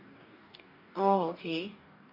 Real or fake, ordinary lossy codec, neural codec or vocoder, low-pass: fake; none; codec, 44.1 kHz, 2.6 kbps, DAC; 5.4 kHz